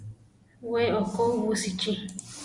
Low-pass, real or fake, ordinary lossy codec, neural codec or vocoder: 10.8 kHz; real; Opus, 64 kbps; none